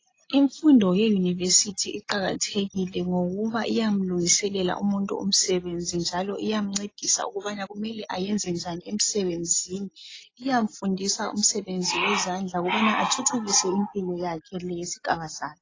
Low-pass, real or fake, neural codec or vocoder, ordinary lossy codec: 7.2 kHz; real; none; AAC, 32 kbps